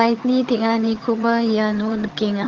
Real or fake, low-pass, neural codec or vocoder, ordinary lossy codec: fake; 7.2 kHz; vocoder, 22.05 kHz, 80 mel bands, HiFi-GAN; Opus, 16 kbps